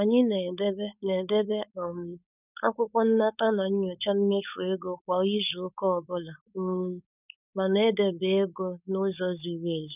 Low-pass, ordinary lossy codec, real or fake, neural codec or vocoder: 3.6 kHz; none; fake; codec, 16 kHz, 4.8 kbps, FACodec